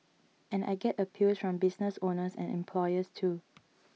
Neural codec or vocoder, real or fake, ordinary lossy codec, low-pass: none; real; none; none